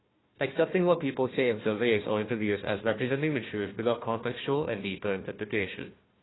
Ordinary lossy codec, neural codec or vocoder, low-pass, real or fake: AAC, 16 kbps; codec, 16 kHz, 1 kbps, FunCodec, trained on Chinese and English, 50 frames a second; 7.2 kHz; fake